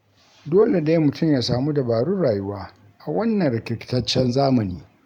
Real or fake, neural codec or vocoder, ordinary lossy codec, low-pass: fake; vocoder, 44.1 kHz, 128 mel bands every 512 samples, BigVGAN v2; none; 19.8 kHz